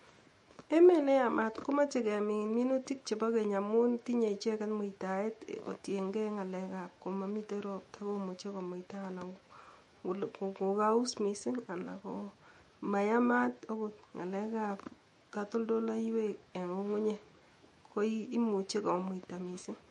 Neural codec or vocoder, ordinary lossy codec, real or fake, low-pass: autoencoder, 48 kHz, 128 numbers a frame, DAC-VAE, trained on Japanese speech; MP3, 48 kbps; fake; 19.8 kHz